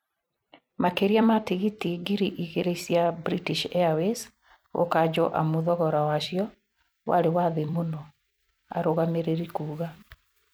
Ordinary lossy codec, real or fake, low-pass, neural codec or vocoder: none; fake; none; vocoder, 44.1 kHz, 128 mel bands every 256 samples, BigVGAN v2